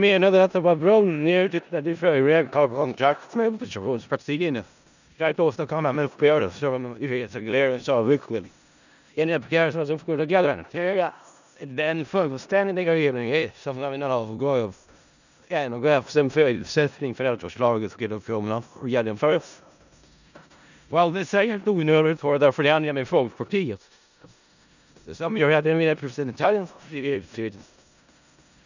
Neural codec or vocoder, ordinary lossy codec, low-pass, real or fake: codec, 16 kHz in and 24 kHz out, 0.4 kbps, LongCat-Audio-Codec, four codebook decoder; none; 7.2 kHz; fake